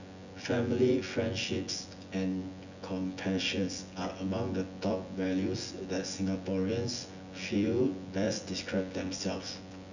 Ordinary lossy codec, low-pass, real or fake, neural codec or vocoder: none; 7.2 kHz; fake; vocoder, 24 kHz, 100 mel bands, Vocos